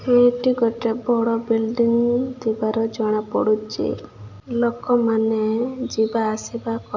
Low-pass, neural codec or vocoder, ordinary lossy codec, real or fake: 7.2 kHz; none; none; real